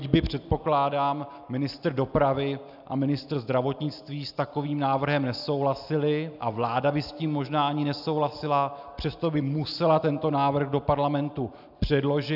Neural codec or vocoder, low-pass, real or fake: none; 5.4 kHz; real